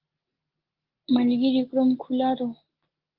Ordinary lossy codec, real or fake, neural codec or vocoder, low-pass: Opus, 16 kbps; real; none; 5.4 kHz